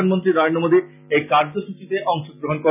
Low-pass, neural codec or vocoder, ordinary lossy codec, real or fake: 3.6 kHz; none; none; real